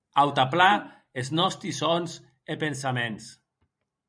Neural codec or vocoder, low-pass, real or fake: vocoder, 44.1 kHz, 128 mel bands every 512 samples, BigVGAN v2; 9.9 kHz; fake